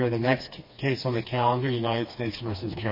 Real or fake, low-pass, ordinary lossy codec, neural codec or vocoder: fake; 5.4 kHz; MP3, 24 kbps; codec, 16 kHz, 2 kbps, FreqCodec, smaller model